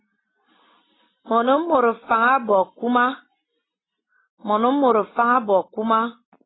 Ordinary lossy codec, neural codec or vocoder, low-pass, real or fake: AAC, 16 kbps; none; 7.2 kHz; real